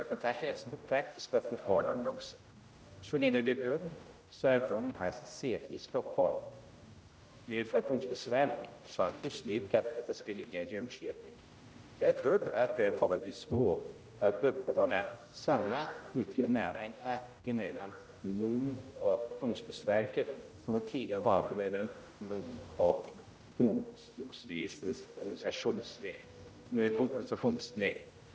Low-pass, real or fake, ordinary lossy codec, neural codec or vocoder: none; fake; none; codec, 16 kHz, 0.5 kbps, X-Codec, HuBERT features, trained on general audio